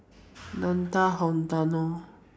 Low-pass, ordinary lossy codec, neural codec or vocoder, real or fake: none; none; none; real